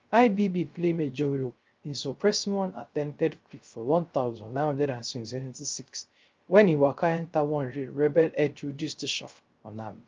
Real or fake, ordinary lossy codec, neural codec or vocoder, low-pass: fake; Opus, 24 kbps; codec, 16 kHz, 0.3 kbps, FocalCodec; 7.2 kHz